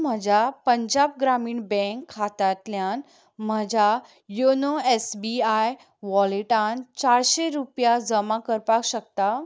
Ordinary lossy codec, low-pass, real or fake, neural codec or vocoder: none; none; real; none